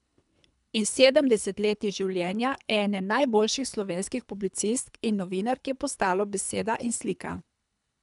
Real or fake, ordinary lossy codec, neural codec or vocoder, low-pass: fake; none; codec, 24 kHz, 3 kbps, HILCodec; 10.8 kHz